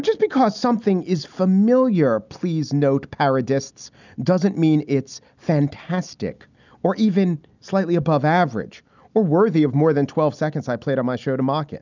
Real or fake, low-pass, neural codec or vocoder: real; 7.2 kHz; none